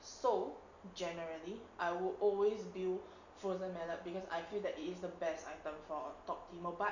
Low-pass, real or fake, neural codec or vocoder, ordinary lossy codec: 7.2 kHz; real; none; none